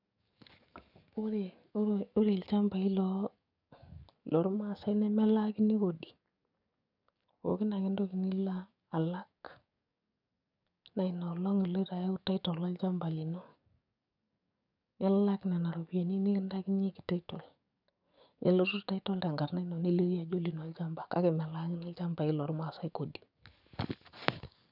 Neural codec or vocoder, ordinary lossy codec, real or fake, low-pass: codec, 44.1 kHz, 7.8 kbps, DAC; none; fake; 5.4 kHz